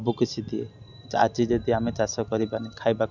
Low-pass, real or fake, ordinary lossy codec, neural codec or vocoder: 7.2 kHz; real; none; none